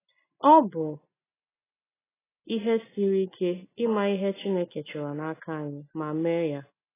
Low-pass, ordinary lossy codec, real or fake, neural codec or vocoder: 3.6 kHz; AAC, 16 kbps; real; none